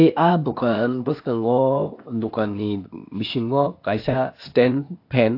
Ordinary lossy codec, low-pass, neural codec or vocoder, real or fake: AAC, 32 kbps; 5.4 kHz; codec, 16 kHz, 0.8 kbps, ZipCodec; fake